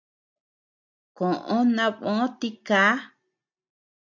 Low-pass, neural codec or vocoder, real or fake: 7.2 kHz; none; real